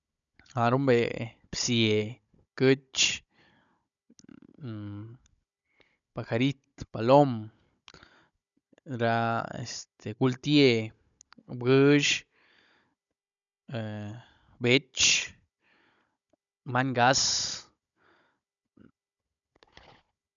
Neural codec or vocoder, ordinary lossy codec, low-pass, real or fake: codec, 16 kHz, 16 kbps, FunCodec, trained on Chinese and English, 50 frames a second; none; 7.2 kHz; fake